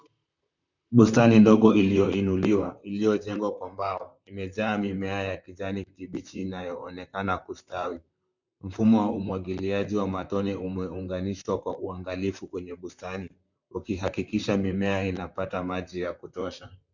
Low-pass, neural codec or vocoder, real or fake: 7.2 kHz; vocoder, 44.1 kHz, 128 mel bands, Pupu-Vocoder; fake